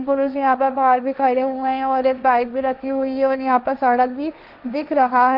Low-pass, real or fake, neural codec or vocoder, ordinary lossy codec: 5.4 kHz; fake; codec, 16 kHz, 1.1 kbps, Voila-Tokenizer; none